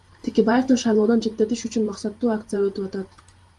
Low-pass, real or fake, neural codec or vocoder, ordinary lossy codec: 10.8 kHz; fake; vocoder, 44.1 kHz, 128 mel bands every 512 samples, BigVGAN v2; Opus, 32 kbps